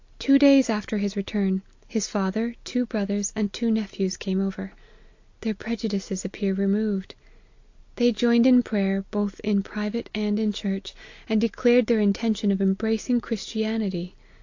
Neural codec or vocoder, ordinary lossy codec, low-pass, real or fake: none; AAC, 48 kbps; 7.2 kHz; real